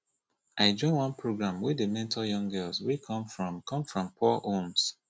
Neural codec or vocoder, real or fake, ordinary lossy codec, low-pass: none; real; none; none